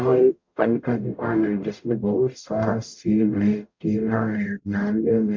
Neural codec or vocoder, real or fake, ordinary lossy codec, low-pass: codec, 44.1 kHz, 0.9 kbps, DAC; fake; MP3, 48 kbps; 7.2 kHz